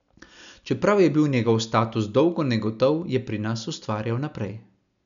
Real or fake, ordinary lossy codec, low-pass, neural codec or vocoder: real; none; 7.2 kHz; none